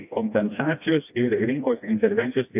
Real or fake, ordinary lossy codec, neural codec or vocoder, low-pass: fake; AAC, 32 kbps; codec, 16 kHz, 1 kbps, FreqCodec, smaller model; 3.6 kHz